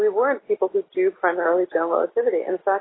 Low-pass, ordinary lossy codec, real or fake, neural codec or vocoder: 7.2 kHz; AAC, 16 kbps; real; none